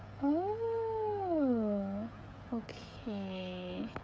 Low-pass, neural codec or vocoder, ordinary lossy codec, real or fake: none; codec, 16 kHz, 16 kbps, FreqCodec, smaller model; none; fake